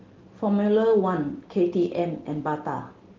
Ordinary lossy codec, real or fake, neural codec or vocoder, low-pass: Opus, 16 kbps; real; none; 7.2 kHz